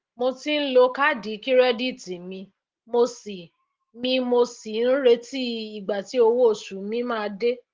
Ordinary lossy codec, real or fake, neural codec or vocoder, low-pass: Opus, 16 kbps; real; none; 7.2 kHz